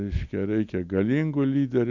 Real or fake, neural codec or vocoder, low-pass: real; none; 7.2 kHz